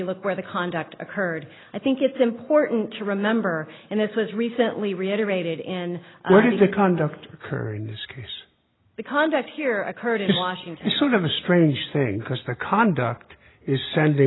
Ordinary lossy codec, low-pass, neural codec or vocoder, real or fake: AAC, 16 kbps; 7.2 kHz; none; real